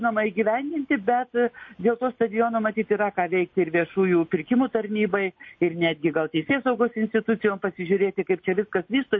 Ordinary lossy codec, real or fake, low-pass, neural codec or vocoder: MP3, 32 kbps; real; 7.2 kHz; none